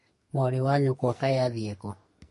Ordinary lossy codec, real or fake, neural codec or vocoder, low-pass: MP3, 48 kbps; fake; codec, 44.1 kHz, 2.6 kbps, SNAC; 14.4 kHz